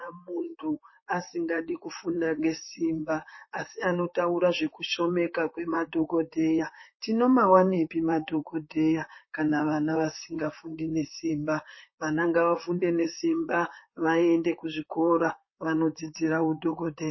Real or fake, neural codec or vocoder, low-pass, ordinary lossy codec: fake; vocoder, 44.1 kHz, 128 mel bands, Pupu-Vocoder; 7.2 kHz; MP3, 24 kbps